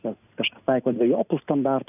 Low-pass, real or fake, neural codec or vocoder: 3.6 kHz; real; none